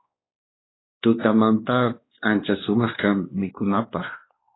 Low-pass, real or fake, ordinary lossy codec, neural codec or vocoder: 7.2 kHz; fake; AAC, 16 kbps; codec, 16 kHz, 2 kbps, X-Codec, WavLM features, trained on Multilingual LibriSpeech